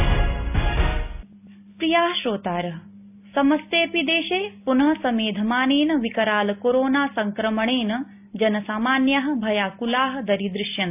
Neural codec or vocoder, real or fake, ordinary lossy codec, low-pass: none; real; AAC, 32 kbps; 3.6 kHz